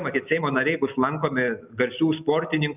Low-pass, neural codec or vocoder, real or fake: 3.6 kHz; none; real